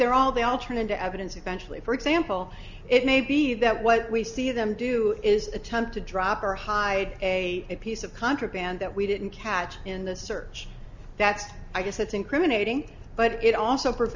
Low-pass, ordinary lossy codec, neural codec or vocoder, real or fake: 7.2 kHz; Opus, 64 kbps; none; real